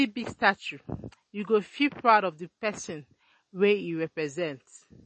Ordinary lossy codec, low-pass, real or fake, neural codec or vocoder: MP3, 32 kbps; 9.9 kHz; real; none